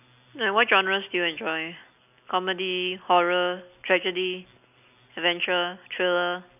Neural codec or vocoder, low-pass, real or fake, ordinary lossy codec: none; 3.6 kHz; real; none